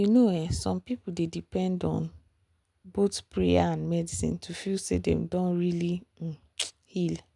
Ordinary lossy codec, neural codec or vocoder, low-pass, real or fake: none; none; 10.8 kHz; real